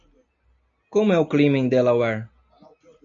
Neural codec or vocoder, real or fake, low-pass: none; real; 7.2 kHz